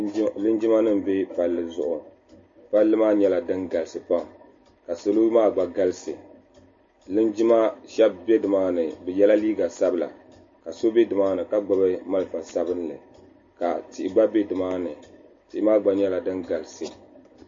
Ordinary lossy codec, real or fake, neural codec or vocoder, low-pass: MP3, 32 kbps; real; none; 7.2 kHz